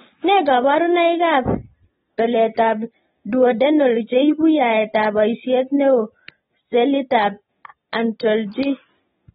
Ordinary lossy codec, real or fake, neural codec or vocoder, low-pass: AAC, 16 kbps; real; none; 19.8 kHz